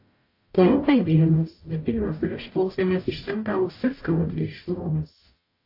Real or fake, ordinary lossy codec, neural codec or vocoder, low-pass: fake; AAC, 32 kbps; codec, 44.1 kHz, 0.9 kbps, DAC; 5.4 kHz